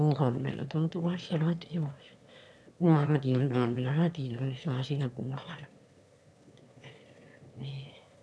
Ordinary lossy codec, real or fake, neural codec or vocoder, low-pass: none; fake; autoencoder, 22.05 kHz, a latent of 192 numbers a frame, VITS, trained on one speaker; none